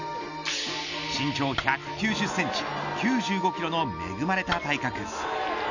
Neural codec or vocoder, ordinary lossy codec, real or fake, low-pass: none; none; real; 7.2 kHz